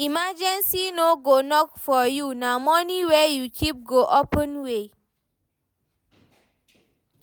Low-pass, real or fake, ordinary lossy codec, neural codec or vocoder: none; real; none; none